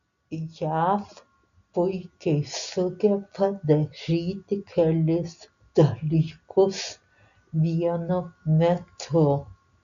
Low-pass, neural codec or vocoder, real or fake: 7.2 kHz; none; real